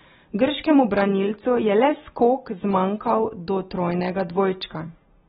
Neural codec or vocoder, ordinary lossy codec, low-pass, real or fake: none; AAC, 16 kbps; 7.2 kHz; real